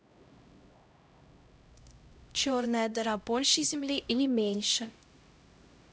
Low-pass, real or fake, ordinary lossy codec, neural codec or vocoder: none; fake; none; codec, 16 kHz, 0.5 kbps, X-Codec, HuBERT features, trained on LibriSpeech